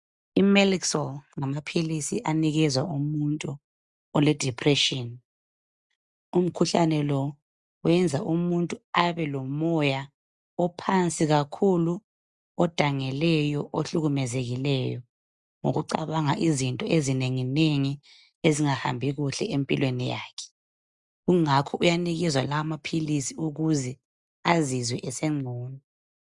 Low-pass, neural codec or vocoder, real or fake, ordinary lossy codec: 10.8 kHz; none; real; Opus, 64 kbps